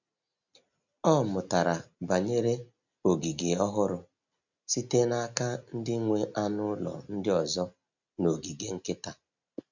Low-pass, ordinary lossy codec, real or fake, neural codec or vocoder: 7.2 kHz; none; real; none